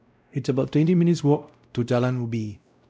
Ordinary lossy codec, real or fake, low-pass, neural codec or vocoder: none; fake; none; codec, 16 kHz, 0.5 kbps, X-Codec, WavLM features, trained on Multilingual LibriSpeech